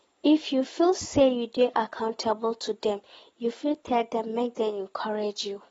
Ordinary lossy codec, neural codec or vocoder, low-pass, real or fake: AAC, 24 kbps; none; 19.8 kHz; real